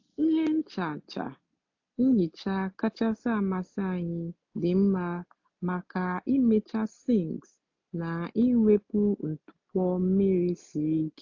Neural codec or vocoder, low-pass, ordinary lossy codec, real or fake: none; 7.2 kHz; AAC, 48 kbps; real